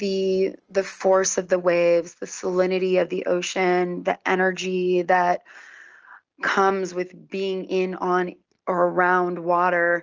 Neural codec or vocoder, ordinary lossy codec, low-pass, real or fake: none; Opus, 24 kbps; 7.2 kHz; real